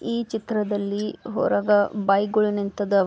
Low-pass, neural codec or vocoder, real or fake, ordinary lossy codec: none; none; real; none